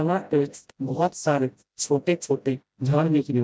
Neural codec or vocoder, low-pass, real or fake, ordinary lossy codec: codec, 16 kHz, 0.5 kbps, FreqCodec, smaller model; none; fake; none